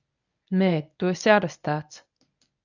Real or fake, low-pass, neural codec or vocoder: fake; 7.2 kHz; codec, 24 kHz, 0.9 kbps, WavTokenizer, medium speech release version 1